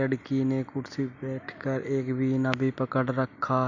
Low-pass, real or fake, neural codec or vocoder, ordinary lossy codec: 7.2 kHz; real; none; none